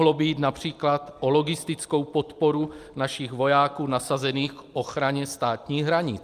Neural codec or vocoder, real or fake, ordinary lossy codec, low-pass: none; real; Opus, 32 kbps; 14.4 kHz